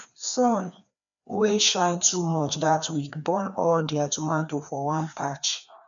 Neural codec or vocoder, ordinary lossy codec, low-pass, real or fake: codec, 16 kHz, 2 kbps, FreqCodec, larger model; none; 7.2 kHz; fake